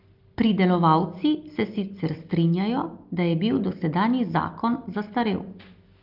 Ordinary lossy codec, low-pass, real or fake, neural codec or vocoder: Opus, 32 kbps; 5.4 kHz; real; none